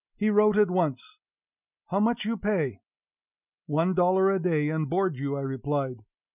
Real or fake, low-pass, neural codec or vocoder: real; 3.6 kHz; none